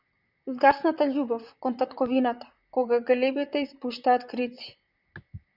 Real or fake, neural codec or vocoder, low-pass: fake; vocoder, 44.1 kHz, 128 mel bands, Pupu-Vocoder; 5.4 kHz